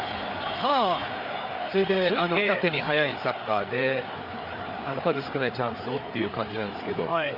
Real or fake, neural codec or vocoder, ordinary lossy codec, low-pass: fake; codec, 16 kHz, 4 kbps, FreqCodec, larger model; none; 5.4 kHz